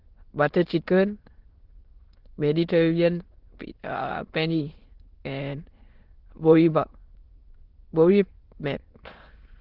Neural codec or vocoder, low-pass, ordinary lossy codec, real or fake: autoencoder, 22.05 kHz, a latent of 192 numbers a frame, VITS, trained on many speakers; 5.4 kHz; Opus, 16 kbps; fake